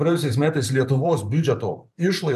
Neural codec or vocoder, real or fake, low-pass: vocoder, 44.1 kHz, 128 mel bands every 512 samples, BigVGAN v2; fake; 14.4 kHz